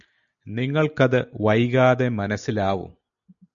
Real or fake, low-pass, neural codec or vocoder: real; 7.2 kHz; none